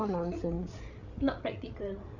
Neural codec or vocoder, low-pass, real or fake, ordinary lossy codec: codec, 16 kHz, 8 kbps, FreqCodec, larger model; 7.2 kHz; fake; none